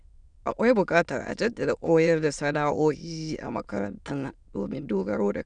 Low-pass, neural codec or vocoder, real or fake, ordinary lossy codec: 9.9 kHz; autoencoder, 22.05 kHz, a latent of 192 numbers a frame, VITS, trained on many speakers; fake; none